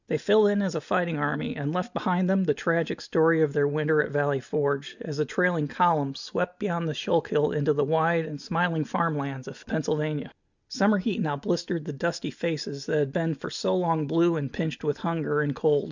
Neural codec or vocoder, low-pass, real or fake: none; 7.2 kHz; real